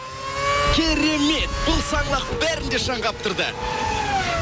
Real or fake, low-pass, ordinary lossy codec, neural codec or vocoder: real; none; none; none